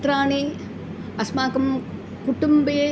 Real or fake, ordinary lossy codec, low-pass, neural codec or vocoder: real; none; none; none